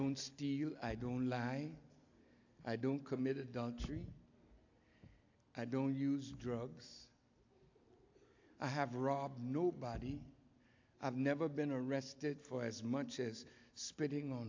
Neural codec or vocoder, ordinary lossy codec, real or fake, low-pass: none; AAC, 48 kbps; real; 7.2 kHz